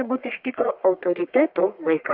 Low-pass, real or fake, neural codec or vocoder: 5.4 kHz; fake; codec, 44.1 kHz, 1.7 kbps, Pupu-Codec